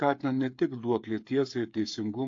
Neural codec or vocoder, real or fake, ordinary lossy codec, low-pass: codec, 16 kHz, 8 kbps, FreqCodec, smaller model; fake; AAC, 48 kbps; 7.2 kHz